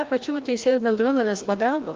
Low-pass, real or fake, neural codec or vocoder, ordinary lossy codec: 7.2 kHz; fake; codec, 16 kHz, 1 kbps, FreqCodec, larger model; Opus, 24 kbps